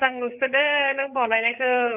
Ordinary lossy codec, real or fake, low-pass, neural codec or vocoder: none; fake; 3.6 kHz; codec, 16 kHz, 8 kbps, FreqCodec, larger model